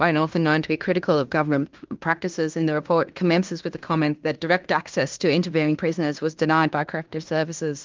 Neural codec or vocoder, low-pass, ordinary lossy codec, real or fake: codec, 16 kHz in and 24 kHz out, 0.9 kbps, LongCat-Audio-Codec, fine tuned four codebook decoder; 7.2 kHz; Opus, 24 kbps; fake